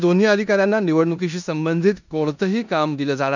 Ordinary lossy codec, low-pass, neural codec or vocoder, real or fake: none; 7.2 kHz; codec, 16 kHz in and 24 kHz out, 0.9 kbps, LongCat-Audio-Codec, four codebook decoder; fake